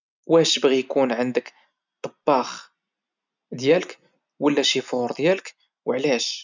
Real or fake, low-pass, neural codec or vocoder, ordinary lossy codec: real; 7.2 kHz; none; none